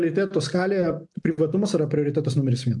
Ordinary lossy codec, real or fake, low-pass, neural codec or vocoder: MP3, 64 kbps; real; 10.8 kHz; none